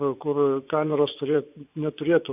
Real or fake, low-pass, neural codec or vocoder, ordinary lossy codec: fake; 3.6 kHz; vocoder, 24 kHz, 100 mel bands, Vocos; AAC, 32 kbps